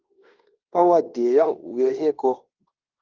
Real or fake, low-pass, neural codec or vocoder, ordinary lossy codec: fake; 7.2 kHz; codec, 16 kHz in and 24 kHz out, 0.9 kbps, LongCat-Audio-Codec, fine tuned four codebook decoder; Opus, 32 kbps